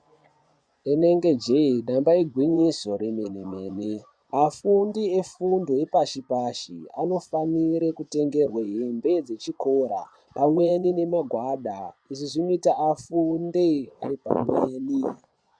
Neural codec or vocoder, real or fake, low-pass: vocoder, 24 kHz, 100 mel bands, Vocos; fake; 9.9 kHz